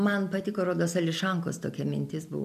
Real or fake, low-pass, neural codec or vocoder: real; 14.4 kHz; none